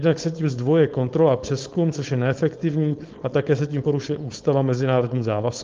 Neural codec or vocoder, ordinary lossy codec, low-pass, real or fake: codec, 16 kHz, 4.8 kbps, FACodec; Opus, 32 kbps; 7.2 kHz; fake